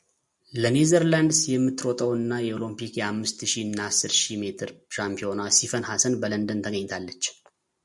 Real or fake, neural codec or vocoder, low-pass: real; none; 10.8 kHz